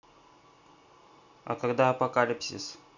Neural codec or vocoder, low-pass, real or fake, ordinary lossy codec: none; 7.2 kHz; real; none